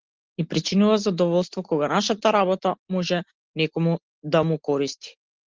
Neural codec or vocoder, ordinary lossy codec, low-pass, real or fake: none; Opus, 16 kbps; 7.2 kHz; real